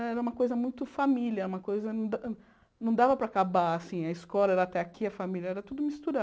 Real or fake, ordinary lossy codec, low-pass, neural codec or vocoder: real; none; none; none